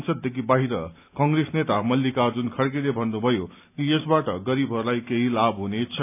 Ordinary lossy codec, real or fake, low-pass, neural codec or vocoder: none; real; 3.6 kHz; none